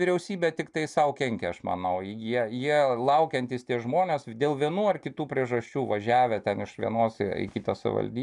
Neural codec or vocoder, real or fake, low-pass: none; real; 10.8 kHz